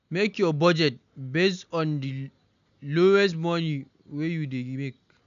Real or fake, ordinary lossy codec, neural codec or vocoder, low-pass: real; none; none; 7.2 kHz